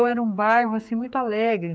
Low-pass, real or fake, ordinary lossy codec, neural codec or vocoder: none; fake; none; codec, 16 kHz, 4 kbps, X-Codec, HuBERT features, trained on general audio